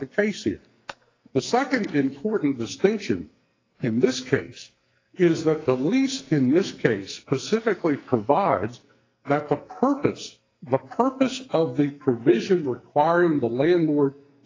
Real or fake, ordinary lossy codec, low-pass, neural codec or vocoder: fake; AAC, 32 kbps; 7.2 kHz; codec, 44.1 kHz, 2.6 kbps, SNAC